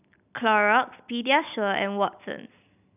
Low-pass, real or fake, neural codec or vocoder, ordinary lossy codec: 3.6 kHz; real; none; none